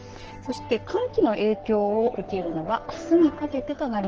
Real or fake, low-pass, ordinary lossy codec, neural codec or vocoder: fake; 7.2 kHz; Opus, 16 kbps; codec, 44.1 kHz, 3.4 kbps, Pupu-Codec